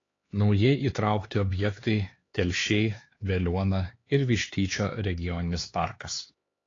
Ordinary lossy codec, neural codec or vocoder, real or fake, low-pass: AAC, 32 kbps; codec, 16 kHz, 4 kbps, X-Codec, HuBERT features, trained on LibriSpeech; fake; 7.2 kHz